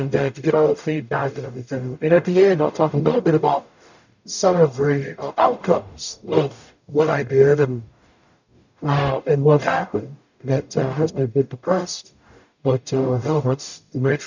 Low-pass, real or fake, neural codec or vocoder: 7.2 kHz; fake; codec, 44.1 kHz, 0.9 kbps, DAC